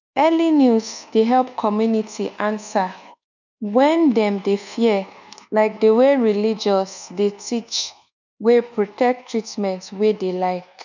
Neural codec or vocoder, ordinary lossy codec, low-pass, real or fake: codec, 24 kHz, 1.2 kbps, DualCodec; none; 7.2 kHz; fake